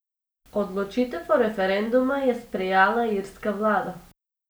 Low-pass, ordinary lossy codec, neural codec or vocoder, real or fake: none; none; none; real